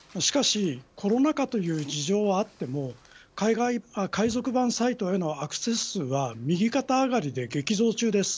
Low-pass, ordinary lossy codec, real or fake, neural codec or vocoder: none; none; real; none